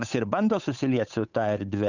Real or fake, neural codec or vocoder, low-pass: fake; codec, 16 kHz, 8 kbps, FunCodec, trained on Chinese and English, 25 frames a second; 7.2 kHz